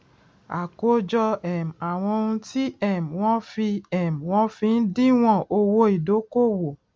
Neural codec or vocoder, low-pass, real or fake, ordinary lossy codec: none; none; real; none